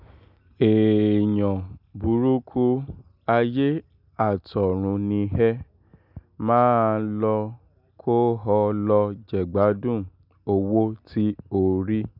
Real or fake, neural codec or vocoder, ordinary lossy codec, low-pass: real; none; none; 5.4 kHz